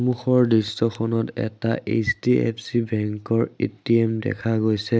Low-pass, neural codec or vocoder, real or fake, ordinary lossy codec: none; none; real; none